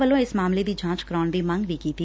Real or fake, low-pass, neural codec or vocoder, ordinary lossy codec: real; none; none; none